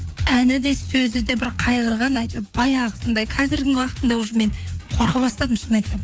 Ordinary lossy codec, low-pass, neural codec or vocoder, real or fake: none; none; codec, 16 kHz, 4 kbps, FreqCodec, larger model; fake